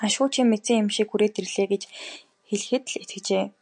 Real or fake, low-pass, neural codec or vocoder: real; 10.8 kHz; none